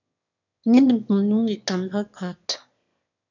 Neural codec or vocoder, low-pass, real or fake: autoencoder, 22.05 kHz, a latent of 192 numbers a frame, VITS, trained on one speaker; 7.2 kHz; fake